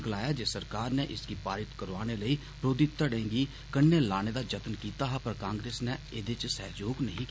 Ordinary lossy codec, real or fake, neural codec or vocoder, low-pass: none; real; none; none